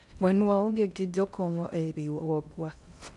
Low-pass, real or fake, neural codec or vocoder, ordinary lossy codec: 10.8 kHz; fake; codec, 16 kHz in and 24 kHz out, 0.6 kbps, FocalCodec, streaming, 4096 codes; none